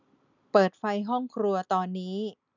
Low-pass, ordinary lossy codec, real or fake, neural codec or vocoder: 7.2 kHz; none; real; none